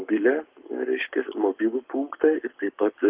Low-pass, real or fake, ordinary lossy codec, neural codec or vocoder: 3.6 kHz; fake; Opus, 32 kbps; codec, 44.1 kHz, 7.8 kbps, Pupu-Codec